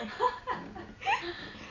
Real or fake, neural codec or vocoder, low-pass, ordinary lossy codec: real; none; 7.2 kHz; none